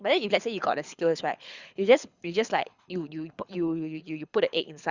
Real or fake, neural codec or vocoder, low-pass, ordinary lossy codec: fake; codec, 24 kHz, 6 kbps, HILCodec; 7.2 kHz; Opus, 64 kbps